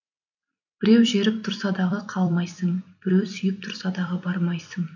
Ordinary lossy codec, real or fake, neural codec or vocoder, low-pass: none; fake; vocoder, 44.1 kHz, 128 mel bands every 256 samples, BigVGAN v2; 7.2 kHz